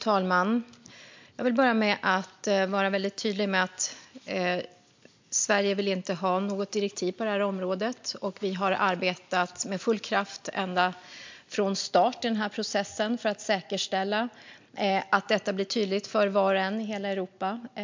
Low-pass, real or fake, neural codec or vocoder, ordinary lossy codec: 7.2 kHz; real; none; MP3, 64 kbps